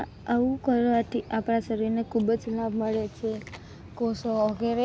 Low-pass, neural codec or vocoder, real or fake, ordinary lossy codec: none; none; real; none